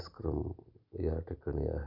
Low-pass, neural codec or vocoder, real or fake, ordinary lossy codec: 5.4 kHz; none; real; none